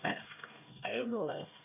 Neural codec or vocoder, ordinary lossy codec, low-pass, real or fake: codec, 16 kHz, 1 kbps, X-Codec, HuBERT features, trained on LibriSpeech; none; 3.6 kHz; fake